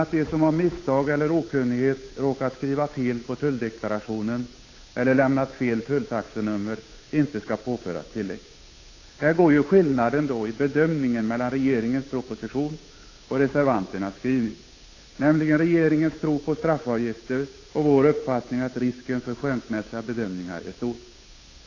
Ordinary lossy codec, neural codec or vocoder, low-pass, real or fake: AAC, 32 kbps; codec, 16 kHz, 8 kbps, FunCodec, trained on Chinese and English, 25 frames a second; 7.2 kHz; fake